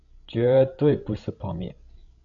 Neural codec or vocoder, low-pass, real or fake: codec, 16 kHz, 16 kbps, FreqCodec, larger model; 7.2 kHz; fake